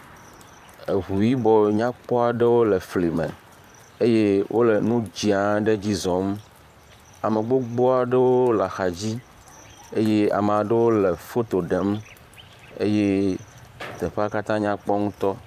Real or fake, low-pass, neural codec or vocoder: fake; 14.4 kHz; vocoder, 44.1 kHz, 128 mel bands, Pupu-Vocoder